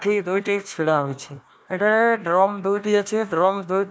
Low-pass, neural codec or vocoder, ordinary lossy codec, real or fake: none; codec, 16 kHz, 1 kbps, FunCodec, trained on Chinese and English, 50 frames a second; none; fake